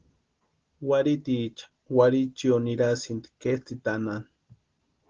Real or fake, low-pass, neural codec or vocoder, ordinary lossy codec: real; 7.2 kHz; none; Opus, 16 kbps